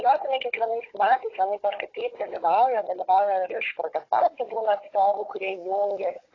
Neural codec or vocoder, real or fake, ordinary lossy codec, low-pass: codec, 16 kHz, 16 kbps, FunCodec, trained on Chinese and English, 50 frames a second; fake; AAC, 32 kbps; 7.2 kHz